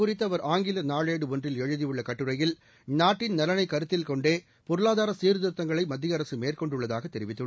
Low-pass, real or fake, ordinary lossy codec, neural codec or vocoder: none; real; none; none